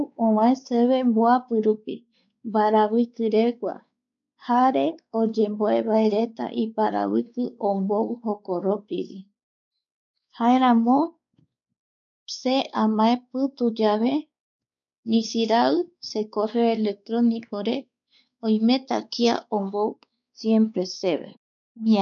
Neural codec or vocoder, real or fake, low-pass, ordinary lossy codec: codec, 16 kHz, 4 kbps, X-Codec, WavLM features, trained on Multilingual LibriSpeech; fake; 7.2 kHz; MP3, 96 kbps